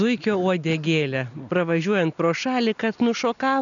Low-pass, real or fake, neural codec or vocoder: 7.2 kHz; real; none